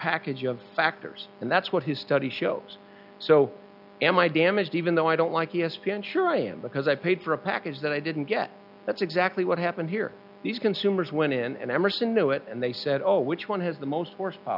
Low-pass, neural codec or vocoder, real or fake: 5.4 kHz; none; real